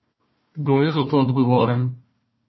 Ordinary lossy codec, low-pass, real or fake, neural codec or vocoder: MP3, 24 kbps; 7.2 kHz; fake; codec, 16 kHz, 1 kbps, FunCodec, trained on Chinese and English, 50 frames a second